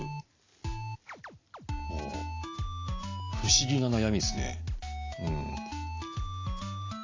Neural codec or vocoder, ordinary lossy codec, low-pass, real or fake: none; AAC, 32 kbps; 7.2 kHz; real